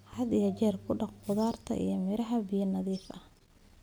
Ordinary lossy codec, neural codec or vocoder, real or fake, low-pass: none; none; real; none